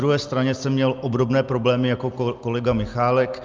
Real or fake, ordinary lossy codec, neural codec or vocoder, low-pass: real; Opus, 24 kbps; none; 7.2 kHz